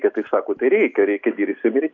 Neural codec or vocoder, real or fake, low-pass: none; real; 7.2 kHz